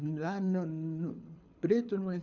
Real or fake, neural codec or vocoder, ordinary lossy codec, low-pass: fake; codec, 24 kHz, 6 kbps, HILCodec; none; 7.2 kHz